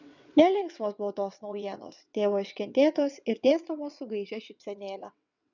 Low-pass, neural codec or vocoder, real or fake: 7.2 kHz; vocoder, 22.05 kHz, 80 mel bands, WaveNeXt; fake